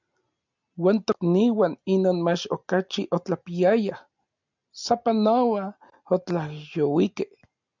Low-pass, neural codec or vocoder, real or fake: 7.2 kHz; none; real